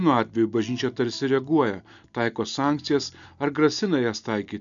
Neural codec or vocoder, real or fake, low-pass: none; real; 7.2 kHz